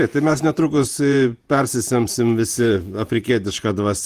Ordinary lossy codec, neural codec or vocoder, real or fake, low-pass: Opus, 24 kbps; vocoder, 48 kHz, 128 mel bands, Vocos; fake; 14.4 kHz